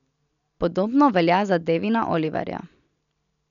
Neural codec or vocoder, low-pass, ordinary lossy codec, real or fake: none; 7.2 kHz; none; real